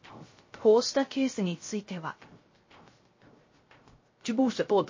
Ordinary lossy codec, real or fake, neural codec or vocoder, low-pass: MP3, 32 kbps; fake; codec, 16 kHz, 0.3 kbps, FocalCodec; 7.2 kHz